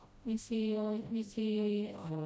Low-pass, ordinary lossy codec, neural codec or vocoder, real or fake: none; none; codec, 16 kHz, 0.5 kbps, FreqCodec, smaller model; fake